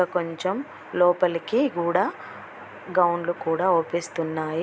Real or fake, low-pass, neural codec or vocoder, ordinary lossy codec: real; none; none; none